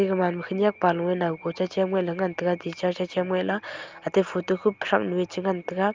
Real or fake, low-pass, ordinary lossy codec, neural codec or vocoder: real; 7.2 kHz; Opus, 24 kbps; none